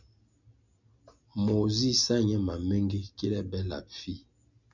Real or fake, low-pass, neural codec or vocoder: real; 7.2 kHz; none